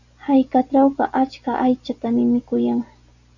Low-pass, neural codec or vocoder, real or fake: 7.2 kHz; none; real